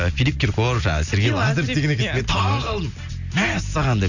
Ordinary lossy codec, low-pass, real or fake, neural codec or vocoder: none; 7.2 kHz; real; none